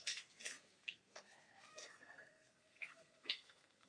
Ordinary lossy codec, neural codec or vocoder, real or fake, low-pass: AAC, 48 kbps; codec, 32 kHz, 1.9 kbps, SNAC; fake; 9.9 kHz